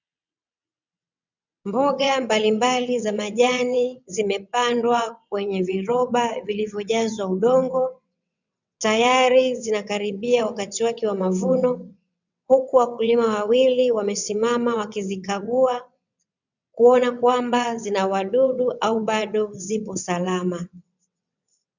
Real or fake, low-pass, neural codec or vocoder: fake; 7.2 kHz; vocoder, 22.05 kHz, 80 mel bands, WaveNeXt